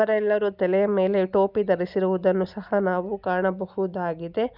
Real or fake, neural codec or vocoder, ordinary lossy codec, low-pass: real; none; none; 5.4 kHz